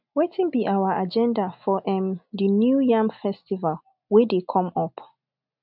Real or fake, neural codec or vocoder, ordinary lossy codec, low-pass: real; none; none; 5.4 kHz